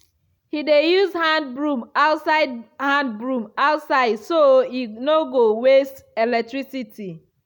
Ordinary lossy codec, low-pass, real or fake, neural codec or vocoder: none; 19.8 kHz; real; none